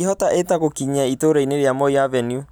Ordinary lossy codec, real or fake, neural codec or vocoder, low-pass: none; real; none; none